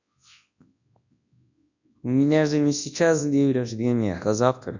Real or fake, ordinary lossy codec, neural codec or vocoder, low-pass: fake; none; codec, 24 kHz, 0.9 kbps, WavTokenizer, large speech release; 7.2 kHz